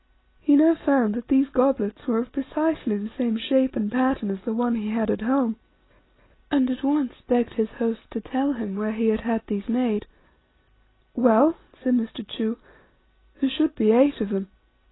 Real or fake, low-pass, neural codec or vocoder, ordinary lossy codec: real; 7.2 kHz; none; AAC, 16 kbps